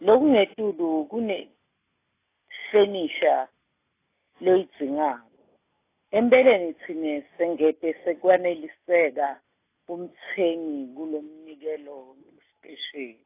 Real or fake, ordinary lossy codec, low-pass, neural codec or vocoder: real; AAC, 24 kbps; 3.6 kHz; none